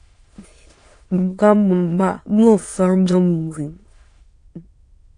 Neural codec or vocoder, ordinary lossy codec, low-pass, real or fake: autoencoder, 22.05 kHz, a latent of 192 numbers a frame, VITS, trained on many speakers; AAC, 48 kbps; 9.9 kHz; fake